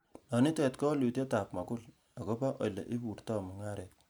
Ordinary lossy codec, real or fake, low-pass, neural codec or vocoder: none; real; none; none